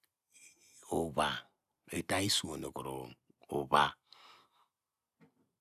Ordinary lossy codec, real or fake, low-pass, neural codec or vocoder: none; real; 14.4 kHz; none